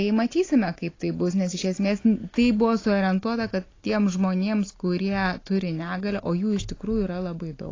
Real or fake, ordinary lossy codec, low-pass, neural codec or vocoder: real; AAC, 32 kbps; 7.2 kHz; none